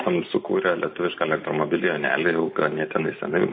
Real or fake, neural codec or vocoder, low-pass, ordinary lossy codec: real; none; 7.2 kHz; MP3, 24 kbps